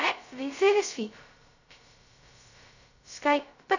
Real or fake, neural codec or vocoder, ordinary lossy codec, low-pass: fake; codec, 16 kHz, 0.2 kbps, FocalCodec; none; 7.2 kHz